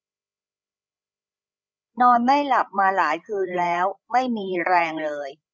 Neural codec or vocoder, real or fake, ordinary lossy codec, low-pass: codec, 16 kHz, 8 kbps, FreqCodec, larger model; fake; none; none